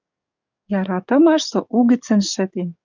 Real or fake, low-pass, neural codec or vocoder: fake; 7.2 kHz; codec, 44.1 kHz, 7.8 kbps, DAC